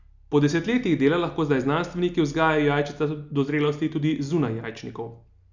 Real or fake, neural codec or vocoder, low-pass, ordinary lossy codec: real; none; 7.2 kHz; Opus, 64 kbps